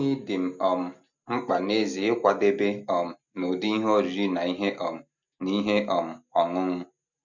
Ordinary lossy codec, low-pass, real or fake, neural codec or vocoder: none; 7.2 kHz; real; none